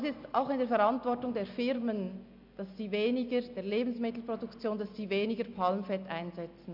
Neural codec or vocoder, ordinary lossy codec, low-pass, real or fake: none; MP3, 48 kbps; 5.4 kHz; real